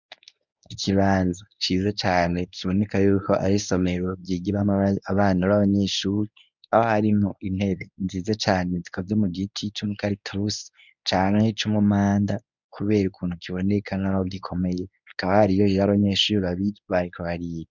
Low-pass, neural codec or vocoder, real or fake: 7.2 kHz; codec, 24 kHz, 0.9 kbps, WavTokenizer, medium speech release version 1; fake